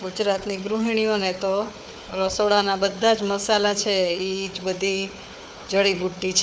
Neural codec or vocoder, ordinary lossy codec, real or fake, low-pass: codec, 16 kHz, 4 kbps, FunCodec, trained on Chinese and English, 50 frames a second; none; fake; none